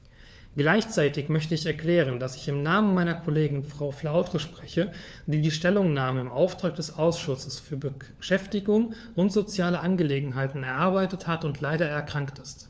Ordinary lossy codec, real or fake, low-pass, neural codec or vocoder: none; fake; none; codec, 16 kHz, 4 kbps, FunCodec, trained on LibriTTS, 50 frames a second